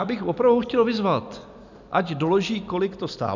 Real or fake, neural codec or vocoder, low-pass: real; none; 7.2 kHz